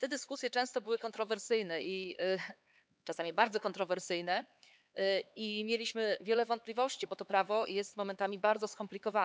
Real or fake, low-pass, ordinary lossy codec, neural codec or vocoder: fake; none; none; codec, 16 kHz, 4 kbps, X-Codec, HuBERT features, trained on LibriSpeech